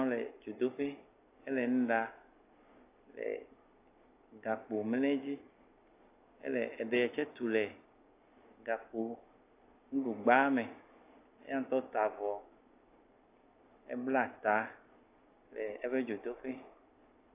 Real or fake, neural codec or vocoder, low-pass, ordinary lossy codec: real; none; 3.6 kHz; AAC, 24 kbps